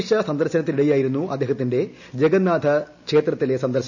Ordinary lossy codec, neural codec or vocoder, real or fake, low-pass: none; none; real; 7.2 kHz